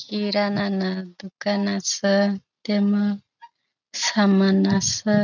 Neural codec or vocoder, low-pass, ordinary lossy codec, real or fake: none; 7.2 kHz; none; real